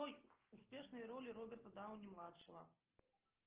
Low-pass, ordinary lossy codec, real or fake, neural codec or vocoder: 3.6 kHz; Opus, 16 kbps; real; none